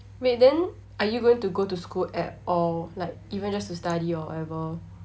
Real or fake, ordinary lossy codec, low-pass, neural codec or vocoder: real; none; none; none